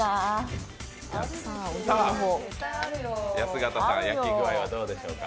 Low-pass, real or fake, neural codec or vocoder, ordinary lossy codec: none; real; none; none